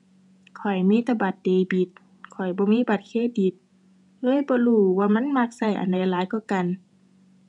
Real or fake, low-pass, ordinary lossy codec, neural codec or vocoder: fake; 10.8 kHz; none; vocoder, 48 kHz, 128 mel bands, Vocos